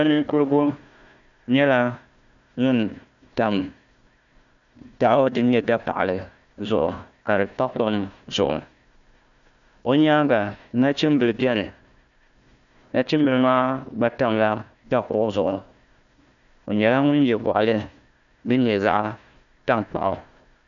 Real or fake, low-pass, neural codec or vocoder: fake; 7.2 kHz; codec, 16 kHz, 1 kbps, FunCodec, trained on Chinese and English, 50 frames a second